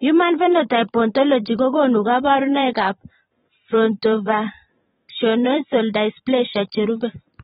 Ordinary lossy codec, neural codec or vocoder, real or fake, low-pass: AAC, 16 kbps; vocoder, 44.1 kHz, 128 mel bands every 512 samples, BigVGAN v2; fake; 19.8 kHz